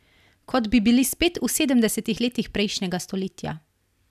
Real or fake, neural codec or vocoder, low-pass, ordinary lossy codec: real; none; 14.4 kHz; none